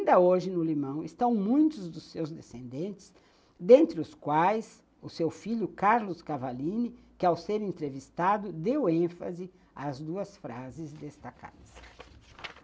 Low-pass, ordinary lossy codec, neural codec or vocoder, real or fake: none; none; none; real